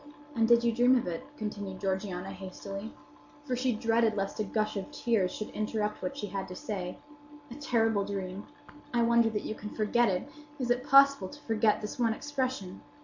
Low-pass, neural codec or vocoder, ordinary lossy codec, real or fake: 7.2 kHz; none; MP3, 64 kbps; real